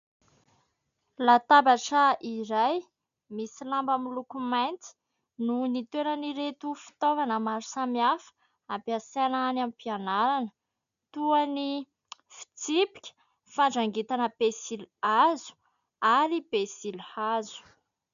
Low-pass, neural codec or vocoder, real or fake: 7.2 kHz; none; real